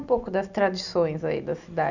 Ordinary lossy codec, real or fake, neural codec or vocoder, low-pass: none; real; none; 7.2 kHz